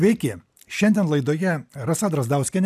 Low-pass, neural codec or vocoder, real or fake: 14.4 kHz; none; real